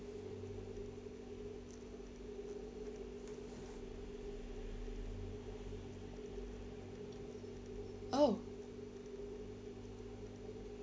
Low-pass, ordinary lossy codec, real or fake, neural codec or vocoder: none; none; real; none